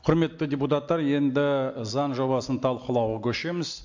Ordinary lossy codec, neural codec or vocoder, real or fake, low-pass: MP3, 48 kbps; none; real; 7.2 kHz